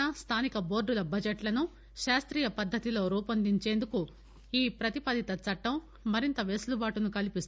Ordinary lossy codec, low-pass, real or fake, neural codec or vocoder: none; none; real; none